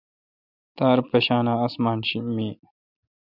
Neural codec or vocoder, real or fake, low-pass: none; real; 5.4 kHz